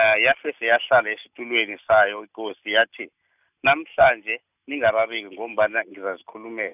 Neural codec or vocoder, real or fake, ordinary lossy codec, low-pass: none; real; none; 3.6 kHz